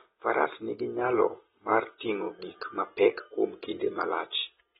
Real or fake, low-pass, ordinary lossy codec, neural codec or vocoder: real; 19.8 kHz; AAC, 16 kbps; none